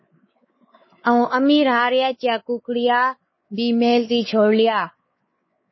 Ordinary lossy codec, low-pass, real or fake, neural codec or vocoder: MP3, 24 kbps; 7.2 kHz; fake; codec, 16 kHz, 4 kbps, X-Codec, WavLM features, trained on Multilingual LibriSpeech